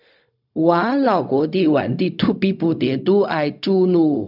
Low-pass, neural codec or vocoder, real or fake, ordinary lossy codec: 5.4 kHz; codec, 16 kHz, 0.4 kbps, LongCat-Audio-Codec; fake; none